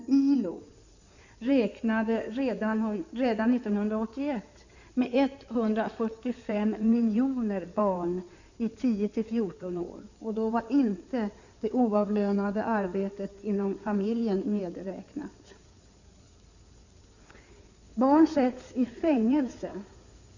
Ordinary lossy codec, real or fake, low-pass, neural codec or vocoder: none; fake; 7.2 kHz; codec, 16 kHz in and 24 kHz out, 2.2 kbps, FireRedTTS-2 codec